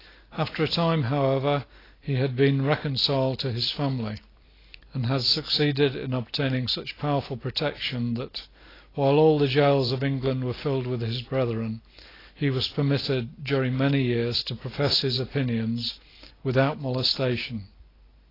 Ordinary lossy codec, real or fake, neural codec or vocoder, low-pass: AAC, 24 kbps; real; none; 5.4 kHz